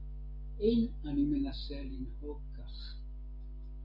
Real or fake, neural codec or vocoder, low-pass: real; none; 5.4 kHz